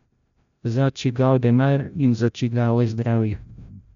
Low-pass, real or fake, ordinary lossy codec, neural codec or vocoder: 7.2 kHz; fake; none; codec, 16 kHz, 0.5 kbps, FreqCodec, larger model